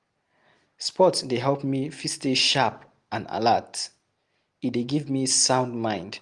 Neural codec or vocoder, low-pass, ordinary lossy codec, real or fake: none; 10.8 kHz; Opus, 24 kbps; real